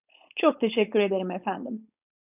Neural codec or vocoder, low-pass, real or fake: codec, 16 kHz, 4.8 kbps, FACodec; 3.6 kHz; fake